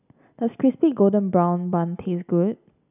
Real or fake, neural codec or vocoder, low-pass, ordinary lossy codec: real; none; 3.6 kHz; none